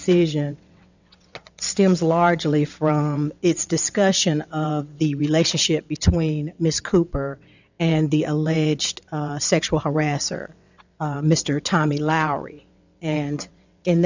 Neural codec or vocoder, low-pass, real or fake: vocoder, 22.05 kHz, 80 mel bands, WaveNeXt; 7.2 kHz; fake